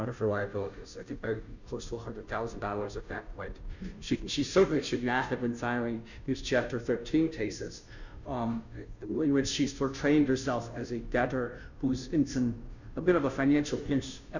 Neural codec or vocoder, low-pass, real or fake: codec, 16 kHz, 0.5 kbps, FunCodec, trained on Chinese and English, 25 frames a second; 7.2 kHz; fake